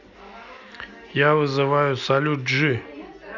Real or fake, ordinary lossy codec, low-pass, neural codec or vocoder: real; none; 7.2 kHz; none